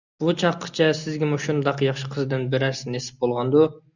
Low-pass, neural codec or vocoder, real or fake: 7.2 kHz; none; real